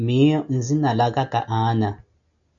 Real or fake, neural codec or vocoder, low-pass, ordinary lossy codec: real; none; 7.2 kHz; AAC, 64 kbps